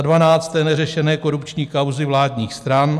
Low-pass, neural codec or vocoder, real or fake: 14.4 kHz; none; real